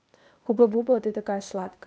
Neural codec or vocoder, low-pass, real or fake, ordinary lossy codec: codec, 16 kHz, 0.8 kbps, ZipCodec; none; fake; none